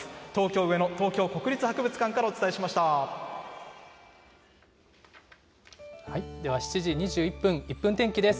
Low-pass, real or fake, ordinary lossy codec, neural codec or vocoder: none; real; none; none